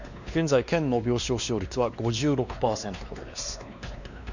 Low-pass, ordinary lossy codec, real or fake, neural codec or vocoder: 7.2 kHz; none; fake; codec, 16 kHz, 2 kbps, X-Codec, WavLM features, trained on Multilingual LibriSpeech